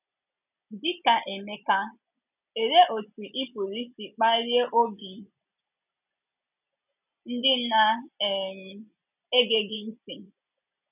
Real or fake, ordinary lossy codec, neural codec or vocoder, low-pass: real; none; none; 3.6 kHz